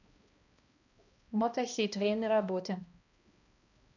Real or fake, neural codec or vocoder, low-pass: fake; codec, 16 kHz, 1 kbps, X-Codec, HuBERT features, trained on balanced general audio; 7.2 kHz